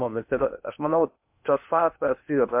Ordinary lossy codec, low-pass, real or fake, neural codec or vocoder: MP3, 32 kbps; 3.6 kHz; fake; codec, 16 kHz in and 24 kHz out, 0.6 kbps, FocalCodec, streaming, 2048 codes